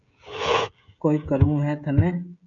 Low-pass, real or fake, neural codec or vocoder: 7.2 kHz; fake; codec, 16 kHz, 16 kbps, FreqCodec, smaller model